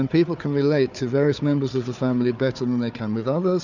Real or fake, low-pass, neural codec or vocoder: fake; 7.2 kHz; codec, 16 kHz, 4 kbps, FunCodec, trained on Chinese and English, 50 frames a second